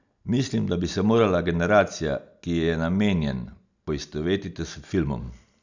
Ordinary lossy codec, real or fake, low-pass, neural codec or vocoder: none; real; 7.2 kHz; none